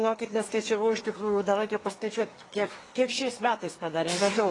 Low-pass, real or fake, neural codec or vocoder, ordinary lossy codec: 10.8 kHz; fake; codec, 24 kHz, 1 kbps, SNAC; AAC, 32 kbps